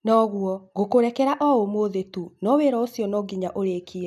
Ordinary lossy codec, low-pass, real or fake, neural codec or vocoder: none; 14.4 kHz; real; none